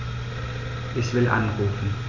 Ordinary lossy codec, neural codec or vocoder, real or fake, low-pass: none; none; real; 7.2 kHz